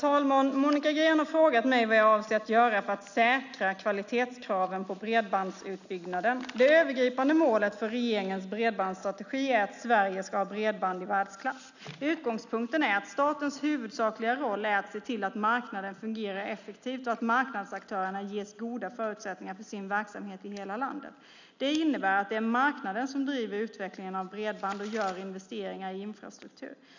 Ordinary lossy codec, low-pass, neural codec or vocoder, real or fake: none; 7.2 kHz; none; real